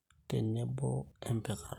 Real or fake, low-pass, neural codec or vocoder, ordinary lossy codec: real; 19.8 kHz; none; none